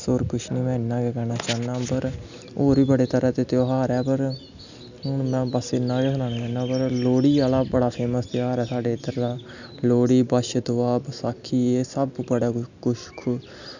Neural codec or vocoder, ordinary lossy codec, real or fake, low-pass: none; none; real; 7.2 kHz